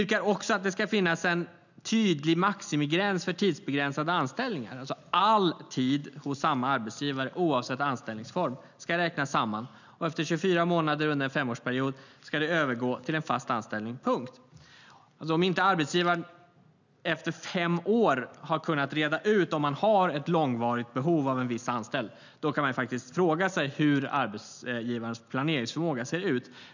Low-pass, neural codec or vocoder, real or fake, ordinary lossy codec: 7.2 kHz; none; real; none